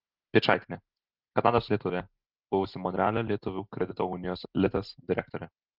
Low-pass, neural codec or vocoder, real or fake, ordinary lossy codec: 5.4 kHz; none; real; Opus, 24 kbps